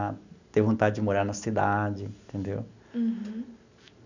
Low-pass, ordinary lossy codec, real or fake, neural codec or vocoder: 7.2 kHz; none; real; none